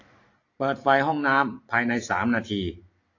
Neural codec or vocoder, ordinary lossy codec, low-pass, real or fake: none; AAC, 48 kbps; 7.2 kHz; real